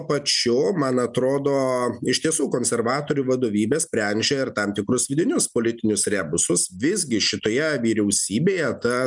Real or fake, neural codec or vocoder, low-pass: real; none; 10.8 kHz